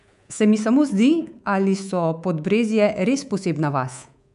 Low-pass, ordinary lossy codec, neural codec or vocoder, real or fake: 10.8 kHz; none; codec, 24 kHz, 3.1 kbps, DualCodec; fake